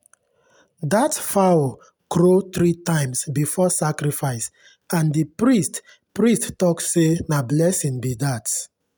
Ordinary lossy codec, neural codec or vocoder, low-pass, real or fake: none; none; none; real